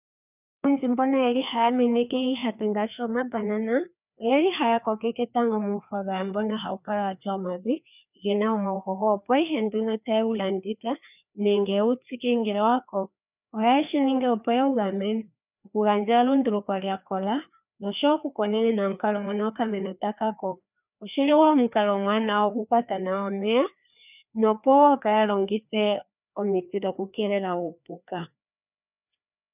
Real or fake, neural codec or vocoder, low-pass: fake; codec, 16 kHz, 2 kbps, FreqCodec, larger model; 3.6 kHz